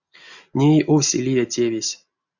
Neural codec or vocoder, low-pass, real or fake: none; 7.2 kHz; real